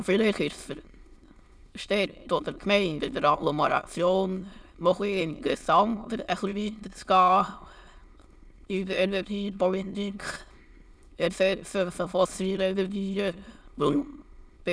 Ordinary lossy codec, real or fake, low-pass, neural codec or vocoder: none; fake; none; autoencoder, 22.05 kHz, a latent of 192 numbers a frame, VITS, trained on many speakers